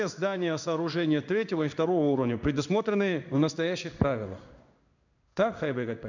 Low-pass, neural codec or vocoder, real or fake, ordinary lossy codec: 7.2 kHz; codec, 16 kHz in and 24 kHz out, 1 kbps, XY-Tokenizer; fake; none